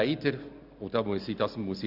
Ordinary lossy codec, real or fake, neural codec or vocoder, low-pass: none; real; none; 5.4 kHz